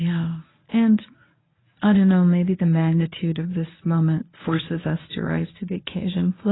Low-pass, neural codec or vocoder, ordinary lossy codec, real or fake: 7.2 kHz; codec, 24 kHz, 0.9 kbps, WavTokenizer, medium speech release version 1; AAC, 16 kbps; fake